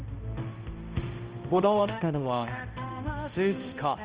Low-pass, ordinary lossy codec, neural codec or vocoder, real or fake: 3.6 kHz; Opus, 24 kbps; codec, 16 kHz, 0.5 kbps, X-Codec, HuBERT features, trained on balanced general audio; fake